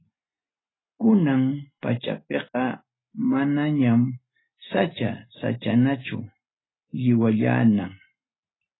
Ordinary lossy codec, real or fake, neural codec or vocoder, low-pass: AAC, 16 kbps; real; none; 7.2 kHz